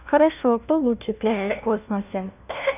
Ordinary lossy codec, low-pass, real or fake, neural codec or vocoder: none; 3.6 kHz; fake; codec, 16 kHz, 1 kbps, FunCodec, trained on LibriTTS, 50 frames a second